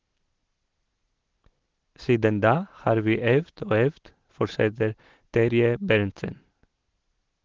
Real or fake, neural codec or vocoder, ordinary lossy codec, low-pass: real; none; Opus, 16 kbps; 7.2 kHz